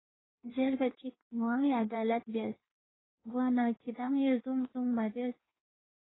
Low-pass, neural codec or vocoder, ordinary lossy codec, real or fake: 7.2 kHz; codec, 16 kHz in and 24 kHz out, 1.1 kbps, FireRedTTS-2 codec; AAC, 16 kbps; fake